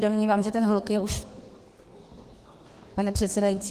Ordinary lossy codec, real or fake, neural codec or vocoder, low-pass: Opus, 24 kbps; fake; codec, 32 kHz, 1.9 kbps, SNAC; 14.4 kHz